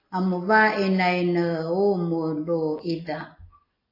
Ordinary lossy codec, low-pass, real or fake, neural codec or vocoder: AAC, 24 kbps; 5.4 kHz; real; none